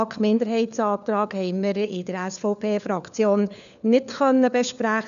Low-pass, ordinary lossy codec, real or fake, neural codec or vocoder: 7.2 kHz; none; fake; codec, 16 kHz, 4 kbps, FunCodec, trained on LibriTTS, 50 frames a second